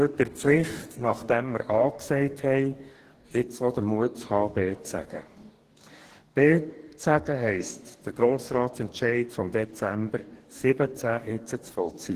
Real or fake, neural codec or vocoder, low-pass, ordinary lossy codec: fake; codec, 44.1 kHz, 2.6 kbps, DAC; 14.4 kHz; Opus, 16 kbps